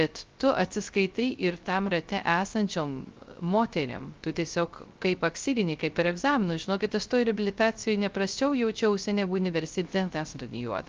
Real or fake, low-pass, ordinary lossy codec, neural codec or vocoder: fake; 7.2 kHz; Opus, 24 kbps; codec, 16 kHz, 0.3 kbps, FocalCodec